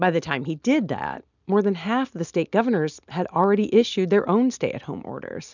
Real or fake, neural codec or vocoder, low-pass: real; none; 7.2 kHz